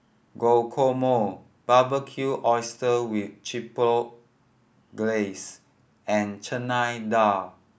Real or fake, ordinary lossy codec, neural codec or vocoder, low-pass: real; none; none; none